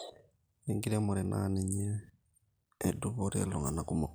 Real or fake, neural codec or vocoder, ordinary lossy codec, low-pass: real; none; none; none